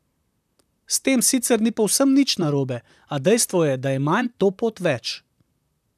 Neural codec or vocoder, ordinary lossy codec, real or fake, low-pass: vocoder, 44.1 kHz, 128 mel bands, Pupu-Vocoder; none; fake; 14.4 kHz